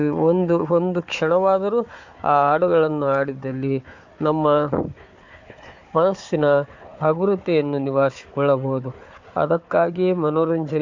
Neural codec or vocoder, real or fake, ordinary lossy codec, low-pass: codec, 16 kHz, 4 kbps, FunCodec, trained on Chinese and English, 50 frames a second; fake; AAC, 48 kbps; 7.2 kHz